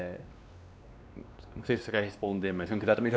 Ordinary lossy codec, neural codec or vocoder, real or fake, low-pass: none; codec, 16 kHz, 2 kbps, X-Codec, WavLM features, trained on Multilingual LibriSpeech; fake; none